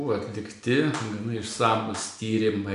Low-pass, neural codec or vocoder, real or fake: 10.8 kHz; none; real